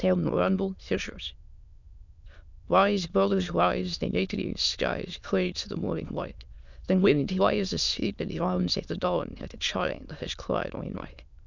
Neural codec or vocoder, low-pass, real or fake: autoencoder, 22.05 kHz, a latent of 192 numbers a frame, VITS, trained on many speakers; 7.2 kHz; fake